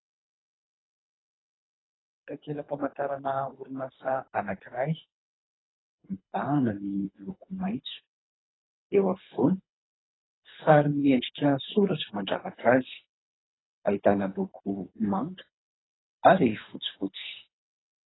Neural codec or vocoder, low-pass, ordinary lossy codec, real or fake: codec, 24 kHz, 3 kbps, HILCodec; 7.2 kHz; AAC, 16 kbps; fake